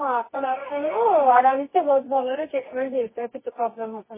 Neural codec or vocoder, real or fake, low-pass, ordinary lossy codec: codec, 24 kHz, 0.9 kbps, WavTokenizer, medium music audio release; fake; 3.6 kHz; MP3, 16 kbps